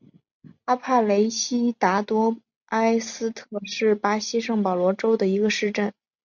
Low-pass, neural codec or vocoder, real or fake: 7.2 kHz; none; real